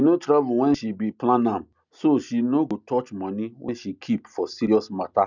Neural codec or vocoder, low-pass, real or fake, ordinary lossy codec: none; 7.2 kHz; real; none